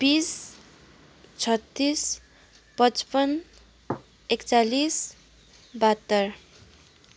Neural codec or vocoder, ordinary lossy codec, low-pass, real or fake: none; none; none; real